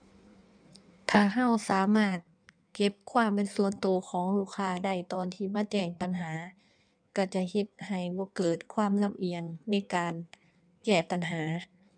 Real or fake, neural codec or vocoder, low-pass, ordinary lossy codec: fake; codec, 16 kHz in and 24 kHz out, 1.1 kbps, FireRedTTS-2 codec; 9.9 kHz; none